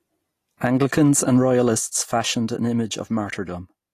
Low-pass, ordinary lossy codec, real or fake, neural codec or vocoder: 14.4 kHz; AAC, 48 kbps; real; none